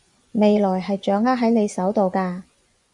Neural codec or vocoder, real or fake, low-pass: vocoder, 24 kHz, 100 mel bands, Vocos; fake; 10.8 kHz